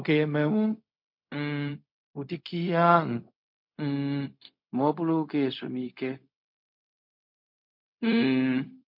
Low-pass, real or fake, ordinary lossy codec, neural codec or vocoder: 5.4 kHz; fake; MP3, 48 kbps; codec, 16 kHz, 0.4 kbps, LongCat-Audio-Codec